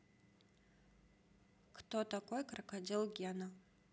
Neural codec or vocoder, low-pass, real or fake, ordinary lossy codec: none; none; real; none